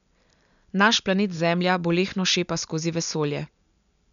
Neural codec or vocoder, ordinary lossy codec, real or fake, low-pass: none; none; real; 7.2 kHz